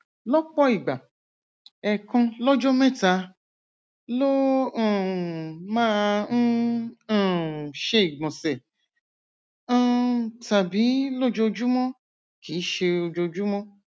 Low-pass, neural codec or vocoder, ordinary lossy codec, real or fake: none; none; none; real